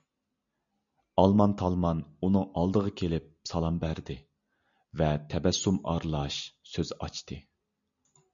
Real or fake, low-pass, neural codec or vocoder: real; 7.2 kHz; none